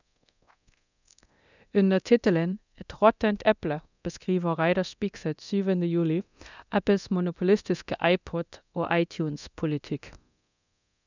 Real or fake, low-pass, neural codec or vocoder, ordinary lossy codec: fake; 7.2 kHz; codec, 24 kHz, 0.9 kbps, DualCodec; none